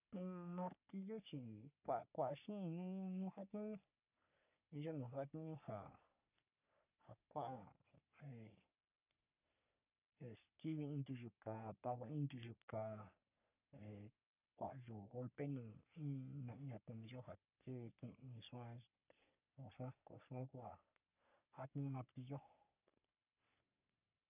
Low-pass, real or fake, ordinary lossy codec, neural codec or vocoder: 3.6 kHz; fake; none; codec, 44.1 kHz, 3.4 kbps, Pupu-Codec